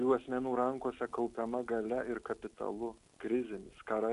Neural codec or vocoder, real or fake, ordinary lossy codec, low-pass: none; real; AAC, 96 kbps; 10.8 kHz